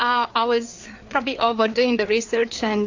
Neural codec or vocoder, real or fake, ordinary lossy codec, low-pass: codec, 16 kHz, 4 kbps, FreqCodec, larger model; fake; AAC, 48 kbps; 7.2 kHz